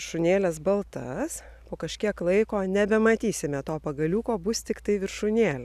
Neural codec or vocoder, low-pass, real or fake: none; 14.4 kHz; real